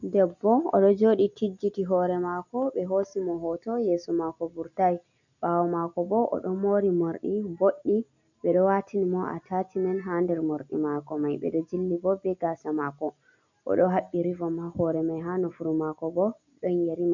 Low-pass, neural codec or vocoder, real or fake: 7.2 kHz; none; real